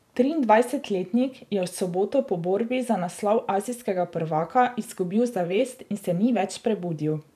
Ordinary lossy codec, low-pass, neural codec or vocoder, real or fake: none; 14.4 kHz; vocoder, 44.1 kHz, 128 mel bands every 512 samples, BigVGAN v2; fake